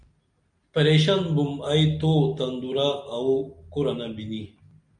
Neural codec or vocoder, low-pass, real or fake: none; 9.9 kHz; real